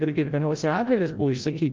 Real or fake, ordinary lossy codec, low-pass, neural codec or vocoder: fake; Opus, 32 kbps; 7.2 kHz; codec, 16 kHz, 0.5 kbps, FreqCodec, larger model